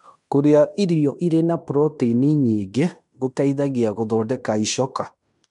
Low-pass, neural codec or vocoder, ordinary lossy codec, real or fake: 10.8 kHz; codec, 16 kHz in and 24 kHz out, 0.9 kbps, LongCat-Audio-Codec, fine tuned four codebook decoder; none; fake